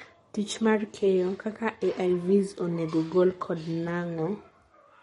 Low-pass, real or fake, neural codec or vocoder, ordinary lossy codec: 19.8 kHz; fake; codec, 44.1 kHz, 7.8 kbps, Pupu-Codec; MP3, 48 kbps